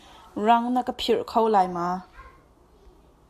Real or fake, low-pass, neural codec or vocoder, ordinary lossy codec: real; 14.4 kHz; none; AAC, 96 kbps